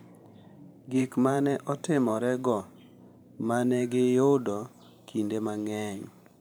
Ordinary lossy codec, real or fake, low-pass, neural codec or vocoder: none; fake; none; vocoder, 44.1 kHz, 128 mel bands every 512 samples, BigVGAN v2